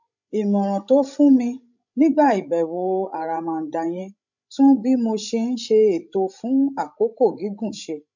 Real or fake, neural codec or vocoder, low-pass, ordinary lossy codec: fake; codec, 16 kHz, 16 kbps, FreqCodec, larger model; 7.2 kHz; none